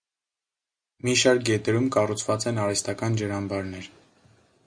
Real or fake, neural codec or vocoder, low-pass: real; none; 9.9 kHz